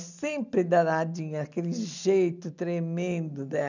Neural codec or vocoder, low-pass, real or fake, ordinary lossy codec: none; 7.2 kHz; real; none